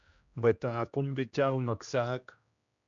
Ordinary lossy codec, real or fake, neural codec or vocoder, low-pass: MP3, 64 kbps; fake; codec, 16 kHz, 1 kbps, X-Codec, HuBERT features, trained on general audio; 7.2 kHz